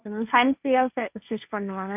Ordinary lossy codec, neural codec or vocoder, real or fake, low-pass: none; codec, 16 kHz, 1.1 kbps, Voila-Tokenizer; fake; 3.6 kHz